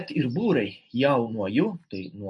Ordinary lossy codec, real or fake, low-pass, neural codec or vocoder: MP3, 48 kbps; real; 10.8 kHz; none